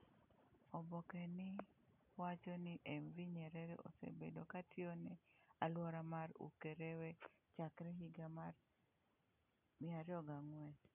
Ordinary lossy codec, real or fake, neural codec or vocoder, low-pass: none; real; none; 3.6 kHz